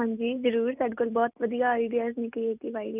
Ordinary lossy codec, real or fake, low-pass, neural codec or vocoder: none; real; 3.6 kHz; none